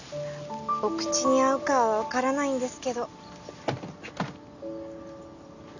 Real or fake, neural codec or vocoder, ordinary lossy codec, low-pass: real; none; none; 7.2 kHz